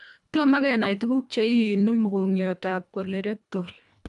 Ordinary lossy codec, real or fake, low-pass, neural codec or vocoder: none; fake; 10.8 kHz; codec, 24 kHz, 1.5 kbps, HILCodec